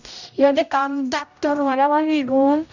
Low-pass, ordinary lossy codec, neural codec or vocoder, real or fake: 7.2 kHz; none; codec, 16 kHz, 0.5 kbps, X-Codec, HuBERT features, trained on general audio; fake